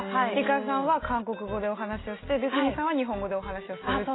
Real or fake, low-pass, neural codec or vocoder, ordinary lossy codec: real; 7.2 kHz; none; AAC, 16 kbps